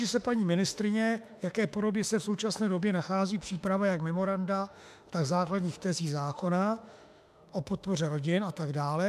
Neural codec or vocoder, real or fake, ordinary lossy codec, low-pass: autoencoder, 48 kHz, 32 numbers a frame, DAC-VAE, trained on Japanese speech; fake; AAC, 96 kbps; 14.4 kHz